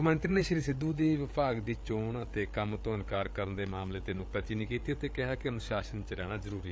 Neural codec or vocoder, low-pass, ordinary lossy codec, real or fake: codec, 16 kHz, 8 kbps, FreqCodec, larger model; none; none; fake